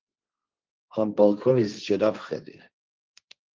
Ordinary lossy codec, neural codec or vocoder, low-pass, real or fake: Opus, 32 kbps; codec, 16 kHz, 1.1 kbps, Voila-Tokenizer; 7.2 kHz; fake